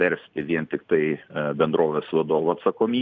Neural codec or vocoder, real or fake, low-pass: none; real; 7.2 kHz